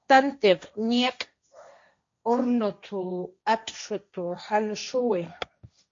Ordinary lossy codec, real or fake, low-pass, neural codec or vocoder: MP3, 48 kbps; fake; 7.2 kHz; codec, 16 kHz, 1.1 kbps, Voila-Tokenizer